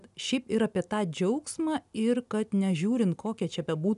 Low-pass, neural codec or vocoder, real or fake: 10.8 kHz; none; real